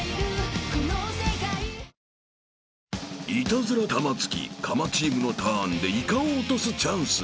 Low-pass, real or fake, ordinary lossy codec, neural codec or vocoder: none; real; none; none